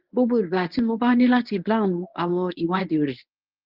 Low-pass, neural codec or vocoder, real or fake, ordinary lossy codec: 5.4 kHz; codec, 24 kHz, 0.9 kbps, WavTokenizer, medium speech release version 1; fake; Opus, 32 kbps